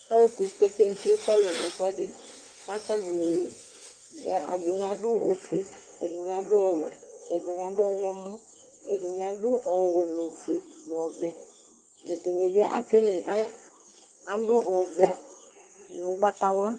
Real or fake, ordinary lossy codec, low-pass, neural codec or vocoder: fake; Opus, 32 kbps; 9.9 kHz; codec, 24 kHz, 1 kbps, SNAC